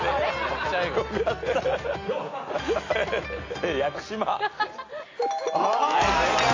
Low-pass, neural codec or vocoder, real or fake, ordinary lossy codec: 7.2 kHz; none; real; AAC, 32 kbps